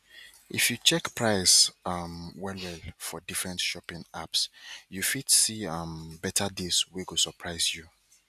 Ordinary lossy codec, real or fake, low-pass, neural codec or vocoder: none; real; 14.4 kHz; none